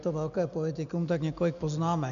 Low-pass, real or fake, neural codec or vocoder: 7.2 kHz; real; none